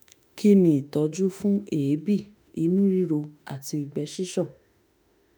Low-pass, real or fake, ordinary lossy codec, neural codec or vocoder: none; fake; none; autoencoder, 48 kHz, 32 numbers a frame, DAC-VAE, trained on Japanese speech